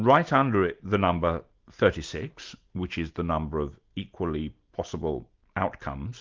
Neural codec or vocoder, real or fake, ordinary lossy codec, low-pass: none; real; Opus, 24 kbps; 7.2 kHz